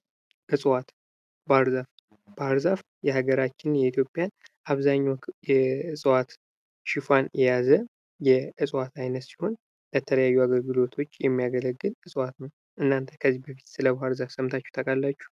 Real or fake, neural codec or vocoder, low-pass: real; none; 14.4 kHz